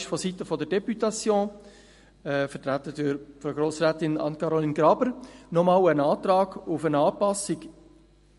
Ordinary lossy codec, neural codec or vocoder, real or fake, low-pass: MP3, 48 kbps; none; real; 14.4 kHz